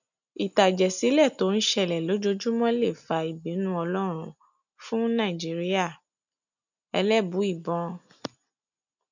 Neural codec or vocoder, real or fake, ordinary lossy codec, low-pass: none; real; none; 7.2 kHz